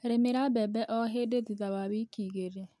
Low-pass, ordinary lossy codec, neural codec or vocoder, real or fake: none; none; none; real